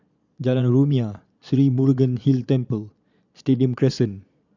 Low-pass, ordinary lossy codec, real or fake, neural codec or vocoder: 7.2 kHz; none; fake; vocoder, 22.05 kHz, 80 mel bands, Vocos